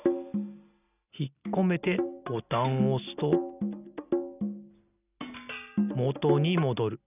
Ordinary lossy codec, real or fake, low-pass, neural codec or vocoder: none; real; 3.6 kHz; none